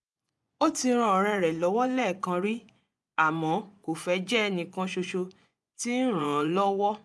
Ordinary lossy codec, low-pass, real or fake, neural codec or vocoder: none; none; fake; vocoder, 24 kHz, 100 mel bands, Vocos